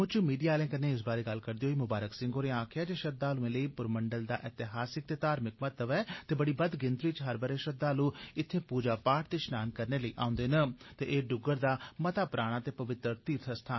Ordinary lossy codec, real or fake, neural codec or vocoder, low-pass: MP3, 24 kbps; real; none; 7.2 kHz